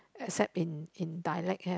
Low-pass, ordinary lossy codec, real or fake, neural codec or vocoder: none; none; real; none